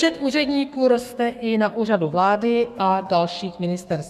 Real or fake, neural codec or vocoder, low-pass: fake; codec, 32 kHz, 1.9 kbps, SNAC; 14.4 kHz